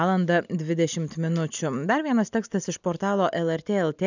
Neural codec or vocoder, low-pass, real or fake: none; 7.2 kHz; real